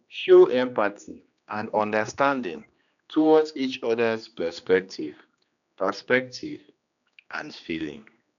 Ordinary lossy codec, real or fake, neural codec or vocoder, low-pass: none; fake; codec, 16 kHz, 2 kbps, X-Codec, HuBERT features, trained on general audio; 7.2 kHz